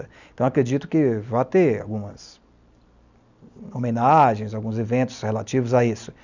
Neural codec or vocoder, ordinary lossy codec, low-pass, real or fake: none; none; 7.2 kHz; real